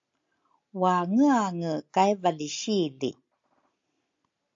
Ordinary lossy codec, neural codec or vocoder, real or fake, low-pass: AAC, 48 kbps; none; real; 7.2 kHz